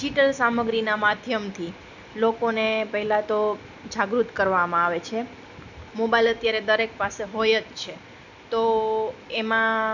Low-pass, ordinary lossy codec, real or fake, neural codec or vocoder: 7.2 kHz; none; real; none